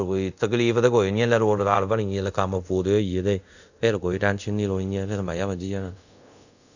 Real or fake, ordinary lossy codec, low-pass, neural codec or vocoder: fake; none; 7.2 kHz; codec, 24 kHz, 0.5 kbps, DualCodec